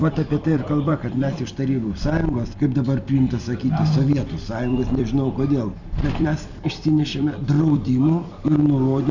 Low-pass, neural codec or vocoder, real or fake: 7.2 kHz; vocoder, 44.1 kHz, 128 mel bands every 256 samples, BigVGAN v2; fake